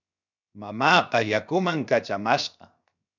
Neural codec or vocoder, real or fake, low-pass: codec, 16 kHz, 0.7 kbps, FocalCodec; fake; 7.2 kHz